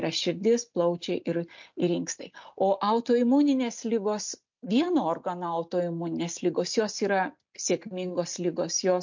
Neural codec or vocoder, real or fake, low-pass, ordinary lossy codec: none; real; 7.2 kHz; MP3, 48 kbps